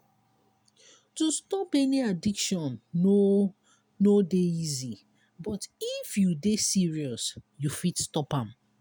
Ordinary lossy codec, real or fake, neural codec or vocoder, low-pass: none; real; none; none